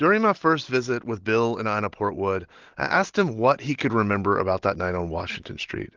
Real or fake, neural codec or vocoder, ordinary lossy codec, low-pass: real; none; Opus, 16 kbps; 7.2 kHz